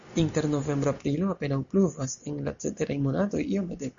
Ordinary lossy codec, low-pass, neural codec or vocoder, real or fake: Opus, 64 kbps; 7.2 kHz; none; real